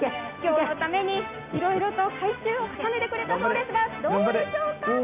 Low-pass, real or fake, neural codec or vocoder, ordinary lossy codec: 3.6 kHz; real; none; AAC, 32 kbps